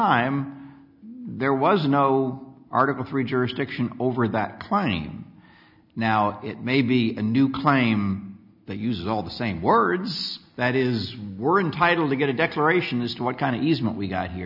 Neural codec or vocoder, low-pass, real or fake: none; 5.4 kHz; real